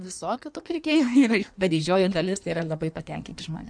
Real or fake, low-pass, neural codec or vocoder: fake; 9.9 kHz; codec, 16 kHz in and 24 kHz out, 1.1 kbps, FireRedTTS-2 codec